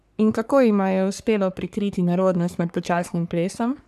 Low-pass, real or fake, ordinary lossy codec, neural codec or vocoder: 14.4 kHz; fake; none; codec, 44.1 kHz, 3.4 kbps, Pupu-Codec